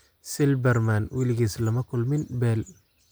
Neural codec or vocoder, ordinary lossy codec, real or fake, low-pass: none; none; real; none